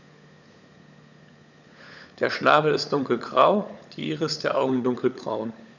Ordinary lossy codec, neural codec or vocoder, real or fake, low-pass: none; codec, 16 kHz, 16 kbps, FunCodec, trained on LibriTTS, 50 frames a second; fake; 7.2 kHz